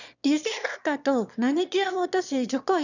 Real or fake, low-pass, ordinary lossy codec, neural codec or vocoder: fake; 7.2 kHz; none; autoencoder, 22.05 kHz, a latent of 192 numbers a frame, VITS, trained on one speaker